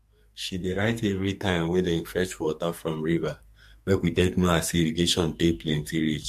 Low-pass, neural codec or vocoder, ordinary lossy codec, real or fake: 14.4 kHz; codec, 44.1 kHz, 2.6 kbps, SNAC; MP3, 64 kbps; fake